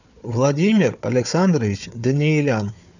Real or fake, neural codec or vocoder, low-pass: fake; codec, 16 kHz, 4 kbps, FunCodec, trained on Chinese and English, 50 frames a second; 7.2 kHz